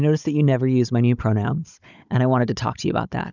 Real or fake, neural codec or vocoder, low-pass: fake; codec, 16 kHz, 16 kbps, FunCodec, trained on Chinese and English, 50 frames a second; 7.2 kHz